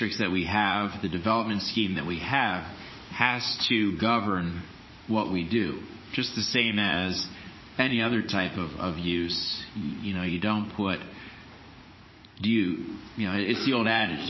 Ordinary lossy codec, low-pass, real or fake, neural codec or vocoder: MP3, 24 kbps; 7.2 kHz; fake; vocoder, 44.1 kHz, 80 mel bands, Vocos